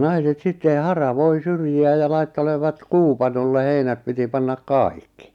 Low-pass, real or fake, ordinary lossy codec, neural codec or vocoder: 19.8 kHz; real; none; none